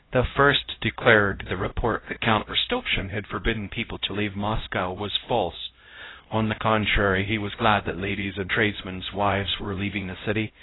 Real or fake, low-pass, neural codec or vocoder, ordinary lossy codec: fake; 7.2 kHz; codec, 16 kHz, 0.5 kbps, X-Codec, HuBERT features, trained on LibriSpeech; AAC, 16 kbps